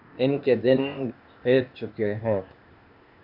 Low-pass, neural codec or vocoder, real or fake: 5.4 kHz; codec, 16 kHz, 0.8 kbps, ZipCodec; fake